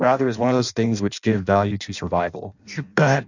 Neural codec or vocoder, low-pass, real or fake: codec, 16 kHz in and 24 kHz out, 0.6 kbps, FireRedTTS-2 codec; 7.2 kHz; fake